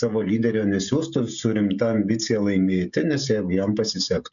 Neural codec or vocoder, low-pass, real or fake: codec, 16 kHz, 16 kbps, FreqCodec, smaller model; 7.2 kHz; fake